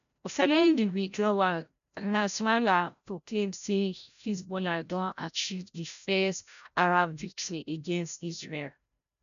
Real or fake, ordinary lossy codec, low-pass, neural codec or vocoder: fake; none; 7.2 kHz; codec, 16 kHz, 0.5 kbps, FreqCodec, larger model